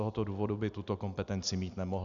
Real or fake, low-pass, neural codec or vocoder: real; 7.2 kHz; none